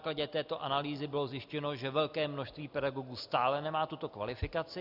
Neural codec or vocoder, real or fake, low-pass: none; real; 5.4 kHz